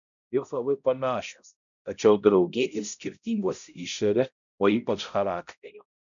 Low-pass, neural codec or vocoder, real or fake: 7.2 kHz; codec, 16 kHz, 0.5 kbps, X-Codec, HuBERT features, trained on balanced general audio; fake